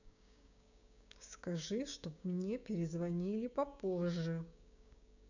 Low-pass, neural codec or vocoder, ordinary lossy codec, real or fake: 7.2 kHz; codec, 16 kHz, 6 kbps, DAC; none; fake